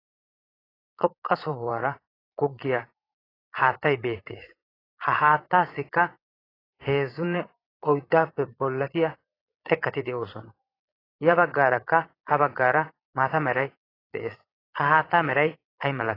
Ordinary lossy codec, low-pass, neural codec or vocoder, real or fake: AAC, 24 kbps; 5.4 kHz; vocoder, 22.05 kHz, 80 mel bands, Vocos; fake